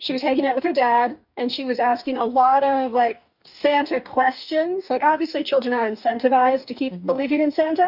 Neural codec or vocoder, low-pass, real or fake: codec, 44.1 kHz, 2.6 kbps, DAC; 5.4 kHz; fake